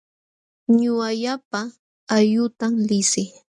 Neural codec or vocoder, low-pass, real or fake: none; 10.8 kHz; real